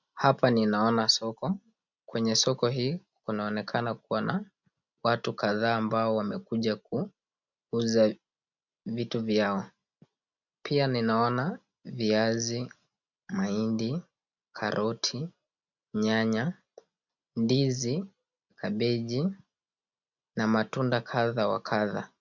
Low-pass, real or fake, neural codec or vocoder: 7.2 kHz; real; none